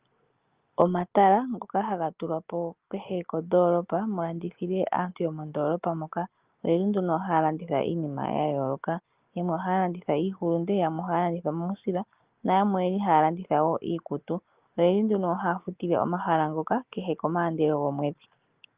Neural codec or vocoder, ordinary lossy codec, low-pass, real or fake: none; Opus, 24 kbps; 3.6 kHz; real